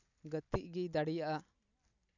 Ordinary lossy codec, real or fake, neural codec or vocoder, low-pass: none; real; none; 7.2 kHz